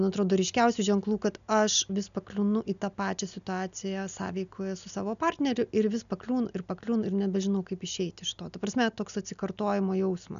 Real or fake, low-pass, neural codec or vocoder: real; 7.2 kHz; none